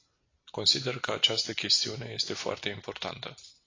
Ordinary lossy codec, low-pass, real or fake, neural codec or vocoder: AAC, 32 kbps; 7.2 kHz; real; none